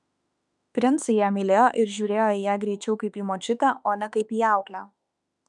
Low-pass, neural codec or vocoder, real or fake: 10.8 kHz; autoencoder, 48 kHz, 32 numbers a frame, DAC-VAE, trained on Japanese speech; fake